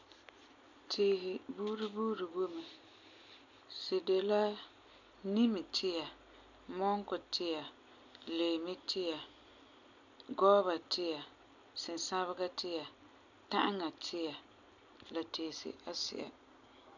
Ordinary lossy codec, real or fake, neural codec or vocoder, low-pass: none; real; none; 7.2 kHz